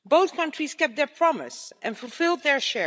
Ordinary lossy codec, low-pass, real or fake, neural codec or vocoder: none; none; fake; codec, 16 kHz, 16 kbps, FreqCodec, larger model